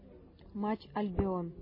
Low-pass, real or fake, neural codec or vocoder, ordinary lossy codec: 5.4 kHz; real; none; MP3, 24 kbps